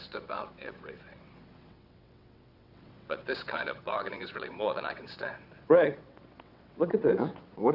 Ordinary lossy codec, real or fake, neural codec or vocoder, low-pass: Opus, 64 kbps; fake; vocoder, 22.05 kHz, 80 mel bands, WaveNeXt; 5.4 kHz